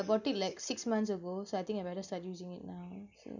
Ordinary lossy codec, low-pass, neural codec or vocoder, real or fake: none; 7.2 kHz; none; real